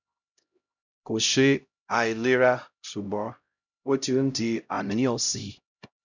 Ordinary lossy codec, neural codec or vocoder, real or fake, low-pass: none; codec, 16 kHz, 0.5 kbps, X-Codec, HuBERT features, trained on LibriSpeech; fake; 7.2 kHz